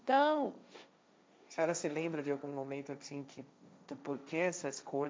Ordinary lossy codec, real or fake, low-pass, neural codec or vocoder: none; fake; none; codec, 16 kHz, 1.1 kbps, Voila-Tokenizer